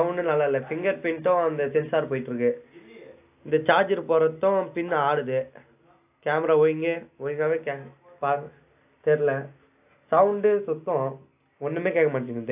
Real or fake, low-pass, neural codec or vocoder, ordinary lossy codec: real; 3.6 kHz; none; AAC, 32 kbps